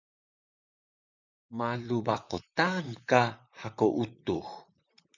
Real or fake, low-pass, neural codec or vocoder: fake; 7.2 kHz; vocoder, 44.1 kHz, 128 mel bands, Pupu-Vocoder